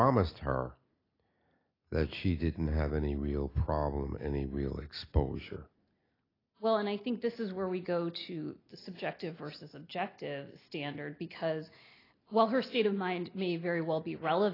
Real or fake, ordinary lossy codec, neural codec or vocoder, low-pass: real; AAC, 24 kbps; none; 5.4 kHz